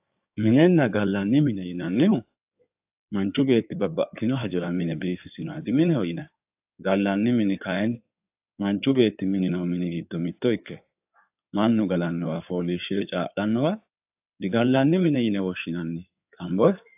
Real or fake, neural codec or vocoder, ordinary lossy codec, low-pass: fake; codec, 16 kHz in and 24 kHz out, 2.2 kbps, FireRedTTS-2 codec; AAC, 32 kbps; 3.6 kHz